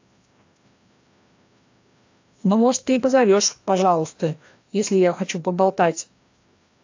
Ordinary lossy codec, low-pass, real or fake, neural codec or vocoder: AAC, 48 kbps; 7.2 kHz; fake; codec, 16 kHz, 1 kbps, FreqCodec, larger model